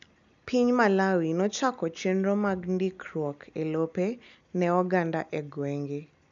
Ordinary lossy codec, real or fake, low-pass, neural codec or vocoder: none; real; 7.2 kHz; none